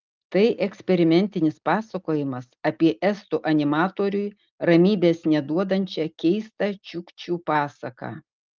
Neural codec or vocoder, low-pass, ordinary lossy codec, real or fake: none; 7.2 kHz; Opus, 24 kbps; real